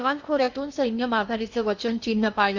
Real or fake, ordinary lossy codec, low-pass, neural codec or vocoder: fake; none; 7.2 kHz; codec, 16 kHz in and 24 kHz out, 0.8 kbps, FocalCodec, streaming, 65536 codes